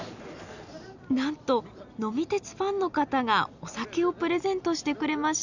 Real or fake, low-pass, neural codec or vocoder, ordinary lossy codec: real; 7.2 kHz; none; none